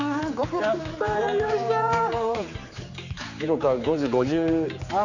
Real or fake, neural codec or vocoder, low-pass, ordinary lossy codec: fake; codec, 16 kHz, 4 kbps, X-Codec, HuBERT features, trained on general audio; 7.2 kHz; none